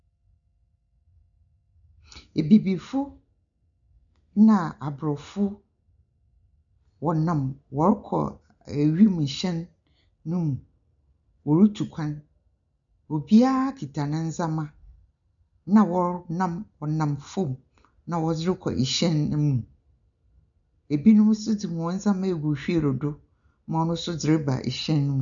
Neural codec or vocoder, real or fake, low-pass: none; real; 7.2 kHz